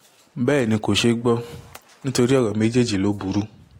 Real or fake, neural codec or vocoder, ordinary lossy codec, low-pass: real; none; AAC, 48 kbps; 19.8 kHz